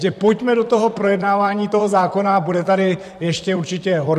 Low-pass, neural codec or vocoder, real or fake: 14.4 kHz; vocoder, 44.1 kHz, 128 mel bands, Pupu-Vocoder; fake